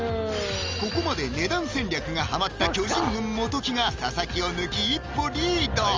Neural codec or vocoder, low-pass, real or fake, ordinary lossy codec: none; 7.2 kHz; real; Opus, 32 kbps